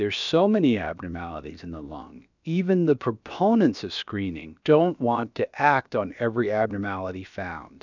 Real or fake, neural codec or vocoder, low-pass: fake; codec, 16 kHz, about 1 kbps, DyCAST, with the encoder's durations; 7.2 kHz